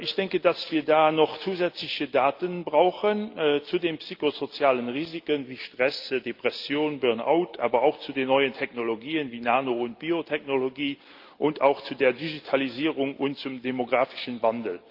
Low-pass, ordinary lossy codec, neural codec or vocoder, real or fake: 5.4 kHz; Opus, 24 kbps; none; real